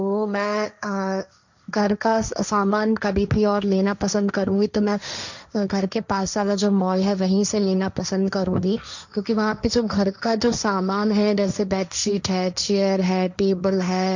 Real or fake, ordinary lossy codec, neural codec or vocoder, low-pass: fake; none; codec, 16 kHz, 1.1 kbps, Voila-Tokenizer; 7.2 kHz